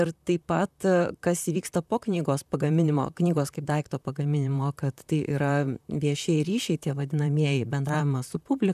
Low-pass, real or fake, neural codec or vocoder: 14.4 kHz; fake; vocoder, 44.1 kHz, 128 mel bands, Pupu-Vocoder